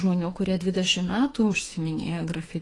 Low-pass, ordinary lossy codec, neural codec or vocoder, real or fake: 10.8 kHz; AAC, 32 kbps; autoencoder, 48 kHz, 32 numbers a frame, DAC-VAE, trained on Japanese speech; fake